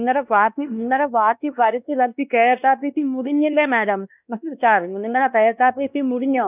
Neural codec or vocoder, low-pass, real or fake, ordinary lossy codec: codec, 16 kHz, 1 kbps, X-Codec, WavLM features, trained on Multilingual LibriSpeech; 3.6 kHz; fake; none